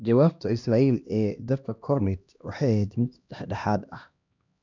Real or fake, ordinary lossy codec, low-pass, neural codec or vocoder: fake; none; 7.2 kHz; codec, 16 kHz, 1 kbps, X-Codec, HuBERT features, trained on LibriSpeech